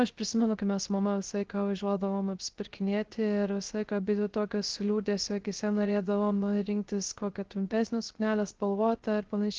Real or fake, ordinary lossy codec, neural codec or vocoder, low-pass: fake; Opus, 16 kbps; codec, 16 kHz, 0.3 kbps, FocalCodec; 7.2 kHz